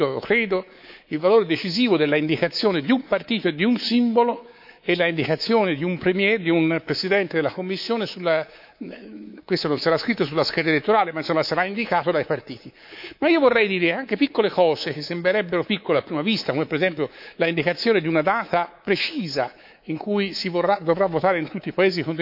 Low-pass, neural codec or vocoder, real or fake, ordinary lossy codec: 5.4 kHz; codec, 24 kHz, 3.1 kbps, DualCodec; fake; AAC, 48 kbps